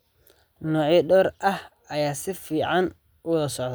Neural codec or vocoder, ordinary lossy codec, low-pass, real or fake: none; none; none; real